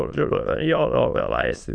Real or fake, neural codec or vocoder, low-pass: fake; autoencoder, 22.05 kHz, a latent of 192 numbers a frame, VITS, trained on many speakers; 9.9 kHz